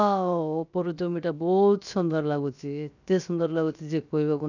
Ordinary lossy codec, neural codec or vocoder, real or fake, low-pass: none; codec, 16 kHz, 0.7 kbps, FocalCodec; fake; 7.2 kHz